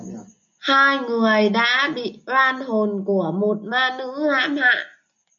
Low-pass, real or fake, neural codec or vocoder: 7.2 kHz; real; none